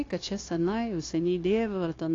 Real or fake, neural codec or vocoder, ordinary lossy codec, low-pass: fake; codec, 16 kHz, 0.9 kbps, LongCat-Audio-Codec; AAC, 32 kbps; 7.2 kHz